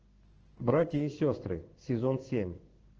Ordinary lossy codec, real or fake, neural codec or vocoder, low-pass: Opus, 16 kbps; fake; codec, 16 kHz in and 24 kHz out, 1 kbps, XY-Tokenizer; 7.2 kHz